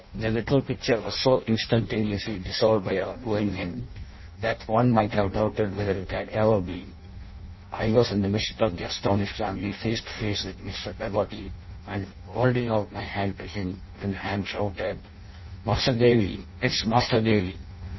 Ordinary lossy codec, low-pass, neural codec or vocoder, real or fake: MP3, 24 kbps; 7.2 kHz; codec, 16 kHz in and 24 kHz out, 0.6 kbps, FireRedTTS-2 codec; fake